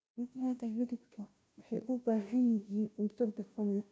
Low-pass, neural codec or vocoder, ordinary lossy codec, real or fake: none; codec, 16 kHz, 0.5 kbps, FunCodec, trained on Chinese and English, 25 frames a second; none; fake